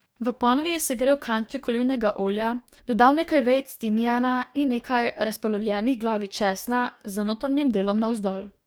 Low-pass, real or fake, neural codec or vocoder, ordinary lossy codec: none; fake; codec, 44.1 kHz, 2.6 kbps, DAC; none